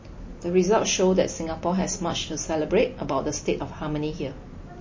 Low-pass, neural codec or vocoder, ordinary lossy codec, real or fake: 7.2 kHz; none; MP3, 32 kbps; real